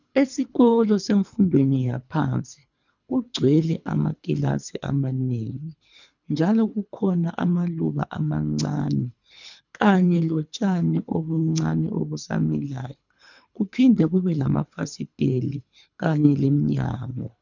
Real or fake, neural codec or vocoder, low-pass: fake; codec, 24 kHz, 3 kbps, HILCodec; 7.2 kHz